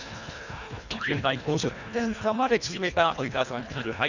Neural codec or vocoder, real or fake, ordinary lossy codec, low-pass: codec, 24 kHz, 1.5 kbps, HILCodec; fake; none; 7.2 kHz